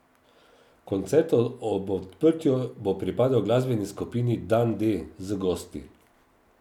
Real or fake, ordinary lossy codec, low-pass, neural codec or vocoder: real; none; 19.8 kHz; none